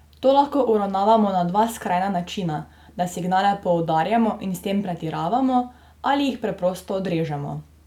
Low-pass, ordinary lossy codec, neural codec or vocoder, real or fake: 19.8 kHz; none; none; real